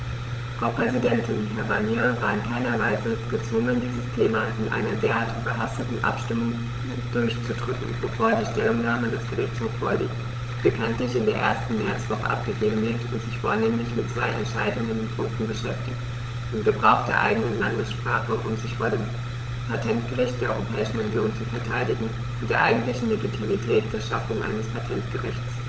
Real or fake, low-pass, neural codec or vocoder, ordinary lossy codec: fake; none; codec, 16 kHz, 16 kbps, FunCodec, trained on LibriTTS, 50 frames a second; none